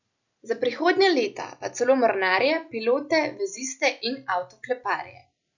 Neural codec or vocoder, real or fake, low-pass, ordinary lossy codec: none; real; 7.2 kHz; none